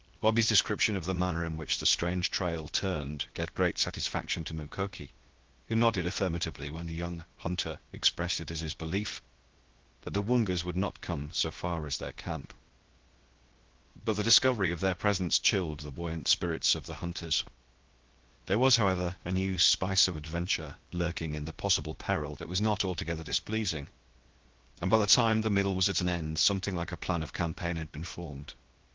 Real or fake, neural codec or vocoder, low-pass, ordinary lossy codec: fake; codec, 16 kHz, 0.7 kbps, FocalCodec; 7.2 kHz; Opus, 16 kbps